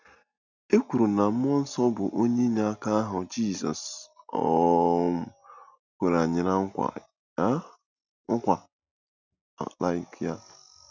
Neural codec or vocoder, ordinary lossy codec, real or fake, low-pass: none; none; real; 7.2 kHz